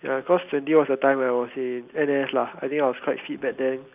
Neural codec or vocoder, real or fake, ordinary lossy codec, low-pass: none; real; none; 3.6 kHz